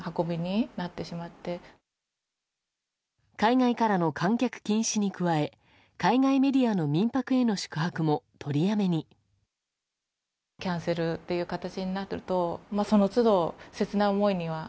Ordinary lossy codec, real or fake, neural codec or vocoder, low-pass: none; real; none; none